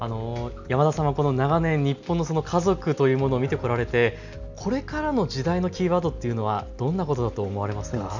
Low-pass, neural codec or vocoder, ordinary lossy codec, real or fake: 7.2 kHz; none; none; real